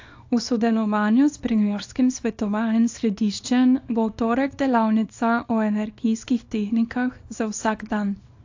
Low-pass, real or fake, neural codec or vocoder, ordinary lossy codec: 7.2 kHz; fake; codec, 24 kHz, 0.9 kbps, WavTokenizer, small release; AAC, 48 kbps